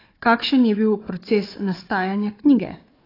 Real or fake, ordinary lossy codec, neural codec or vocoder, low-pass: fake; AAC, 24 kbps; codec, 16 kHz, 4 kbps, FunCodec, trained on Chinese and English, 50 frames a second; 5.4 kHz